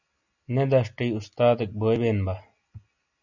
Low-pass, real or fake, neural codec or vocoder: 7.2 kHz; real; none